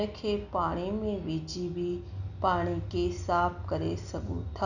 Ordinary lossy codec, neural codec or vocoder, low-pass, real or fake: none; none; 7.2 kHz; real